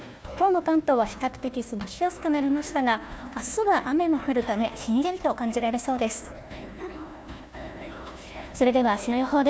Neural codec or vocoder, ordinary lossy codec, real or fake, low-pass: codec, 16 kHz, 1 kbps, FunCodec, trained on Chinese and English, 50 frames a second; none; fake; none